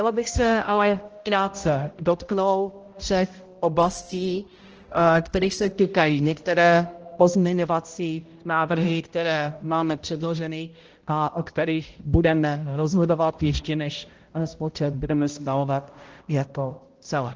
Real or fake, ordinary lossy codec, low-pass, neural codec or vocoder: fake; Opus, 16 kbps; 7.2 kHz; codec, 16 kHz, 0.5 kbps, X-Codec, HuBERT features, trained on balanced general audio